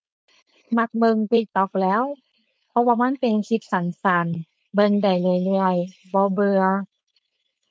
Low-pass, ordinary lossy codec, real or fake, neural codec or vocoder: none; none; fake; codec, 16 kHz, 4.8 kbps, FACodec